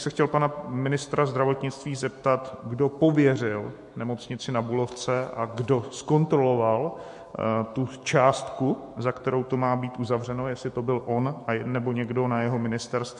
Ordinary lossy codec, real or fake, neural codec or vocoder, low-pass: MP3, 48 kbps; fake; autoencoder, 48 kHz, 128 numbers a frame, DAC-VAE, trained on Japanese speech; 14.4 kHz